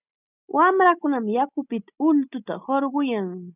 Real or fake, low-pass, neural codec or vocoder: real; 3.6 kHz; none